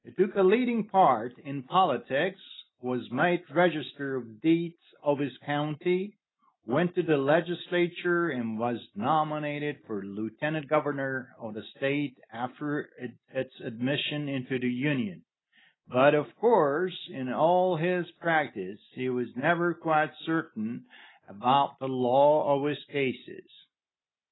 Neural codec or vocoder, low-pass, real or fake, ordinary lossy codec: none; 7.2 kHz; real; AAC, 16 kbps